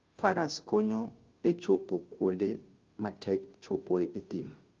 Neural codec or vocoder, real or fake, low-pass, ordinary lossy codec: codec, 16 kHz, 0.5 kbps, FunCodec, trained on Chinese and English, 25 frames a second; fake; 7.2 kHz; Opus, 32 kbps